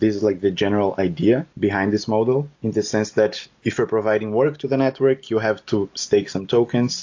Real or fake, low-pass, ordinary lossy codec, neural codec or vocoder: real; 7.2 kHz; AAC, 48 kbps; none